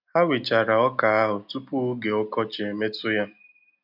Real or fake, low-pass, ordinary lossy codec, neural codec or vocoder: real; 5.4 kHz; none; none